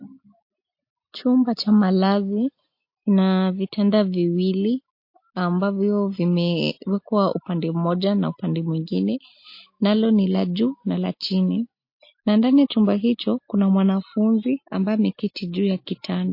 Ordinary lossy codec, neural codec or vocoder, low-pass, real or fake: MP3, 32 kbps; none; 5.4 kHz; real